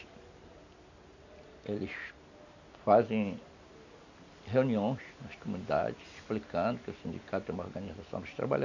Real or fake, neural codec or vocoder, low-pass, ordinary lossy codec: real; none; 7.2 kHz; none